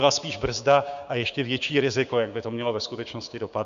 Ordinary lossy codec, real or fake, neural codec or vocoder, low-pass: AAC, 96 kbps; fake; codec, 16 kHz, 6 kbps, DAC; 7.2 kHz